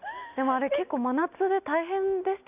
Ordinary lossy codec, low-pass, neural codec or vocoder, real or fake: none; 3.6 kHz; none; real